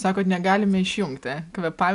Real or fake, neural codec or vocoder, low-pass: real; none; 10.8 kHz